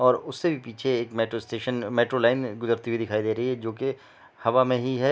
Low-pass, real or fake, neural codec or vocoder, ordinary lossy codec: none; real; none; none